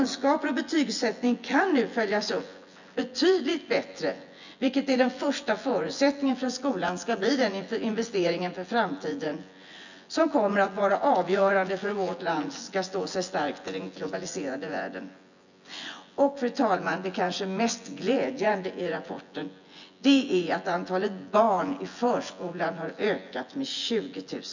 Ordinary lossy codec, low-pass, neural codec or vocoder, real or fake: none; 7.2 kHz; vocoder, 24 kHz, 100 mel bands, Vocos; fake